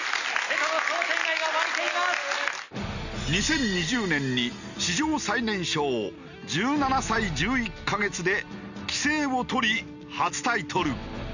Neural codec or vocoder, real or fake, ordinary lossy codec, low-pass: none; real; none; 7.2 kHz